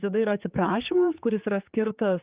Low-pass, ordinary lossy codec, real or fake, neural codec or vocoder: 3.6 kHz; Opus, 32 kbps; fake; codec, 16 kHz, 4 kbps, X-Codec, HuBERT features, trained on general audio